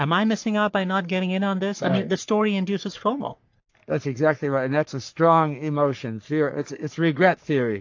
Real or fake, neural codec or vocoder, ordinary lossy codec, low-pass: fake; codec, 44.1 kHz, 3.4 kbps, Pupu-Codec; AAC, 48 kbps; 7.2 kHz